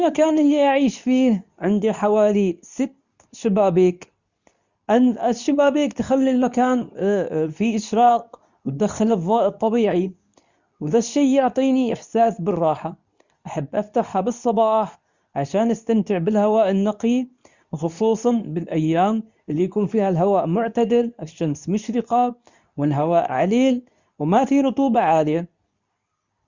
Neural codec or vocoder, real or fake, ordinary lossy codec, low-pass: codec, 24 kHz, 0.9 kbps, WavTokenizer, medium speech release version 2; fake; Opus, 64 kbps; 7.2 kHz